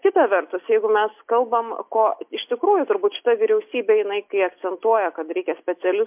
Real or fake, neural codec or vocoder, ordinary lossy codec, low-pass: real; none; MP3, 32 kbps; 3.6 kHz